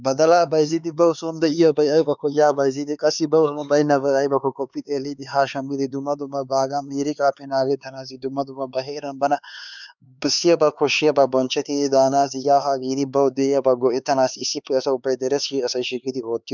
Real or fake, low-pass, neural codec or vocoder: fake; 7.2 kHz; codec, 16 kHz, 4 kbps, X-Codec, HuBERT features, trained on LibriSpeech